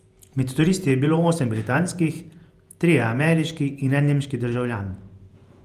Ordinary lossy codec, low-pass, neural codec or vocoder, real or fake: Opus, 32 kbps; 14.4 kHz; vocoder, 48 kHz, 128 mel bands, Vocos; fake